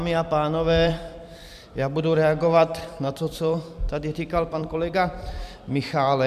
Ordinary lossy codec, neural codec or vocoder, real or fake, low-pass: MP3, 96 kbps; vocoder, 44.1 kHz, 128 mel bands every 256 samples, BigVGAN v2; fake; 14.4 kHz